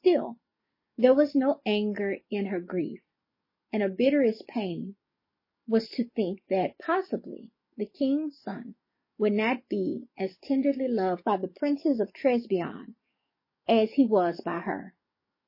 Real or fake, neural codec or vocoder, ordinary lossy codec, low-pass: fake; codec, 44.1 kHz, 7.8 kbps, DAC; MP3, 24 kbps; 5.4 kHz